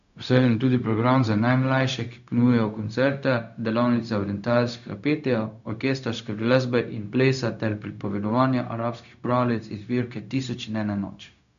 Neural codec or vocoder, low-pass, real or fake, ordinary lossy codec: codec, 16 kHz, 0.4 kbps, LongCat-Audio-Codec; 7.2 kHz; fake; none